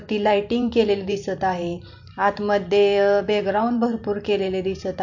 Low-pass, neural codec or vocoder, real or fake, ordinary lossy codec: 7.2 kHz; vocoder, 44.1 kHz, 128 mel bands every 512 samples, BigVGAN v2; fake; MP3, 48 kbps